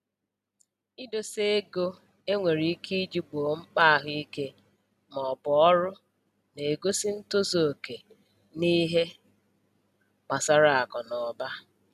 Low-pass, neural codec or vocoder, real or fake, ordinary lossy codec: 14.4 kHz; none; real; none